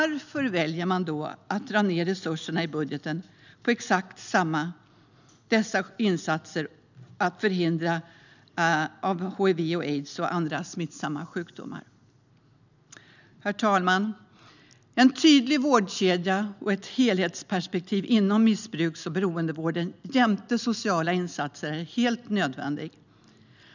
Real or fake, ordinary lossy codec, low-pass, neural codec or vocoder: real; none; 7.2 kHz; none